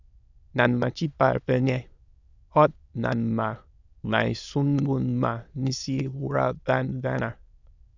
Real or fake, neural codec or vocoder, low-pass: fake; autoencoder, 22.05 kHz, a latent of 192 numbers a frame, VITS, trained on many speakers; 7.2 kHz